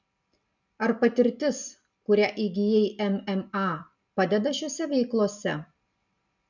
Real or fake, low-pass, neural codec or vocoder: real; 7.2 kHz; none